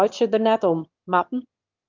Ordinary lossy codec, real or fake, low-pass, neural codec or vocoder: Opus, 24 kbps; fake; 7.2 kHz; autoencoder, 22.05 kHz, a latent of 192 numbers a frame, VITS, trained on one speaker